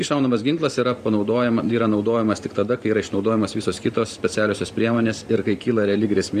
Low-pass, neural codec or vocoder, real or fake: 14.4 kHz; vocoder, 44.1 kHz, 128 mel bands every 512 samples, BigVGAN v2; fake